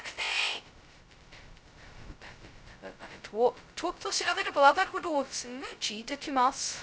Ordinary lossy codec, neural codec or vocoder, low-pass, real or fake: none; codec, 16 kHz, 0.2 kbps, FocalCodec; none; fake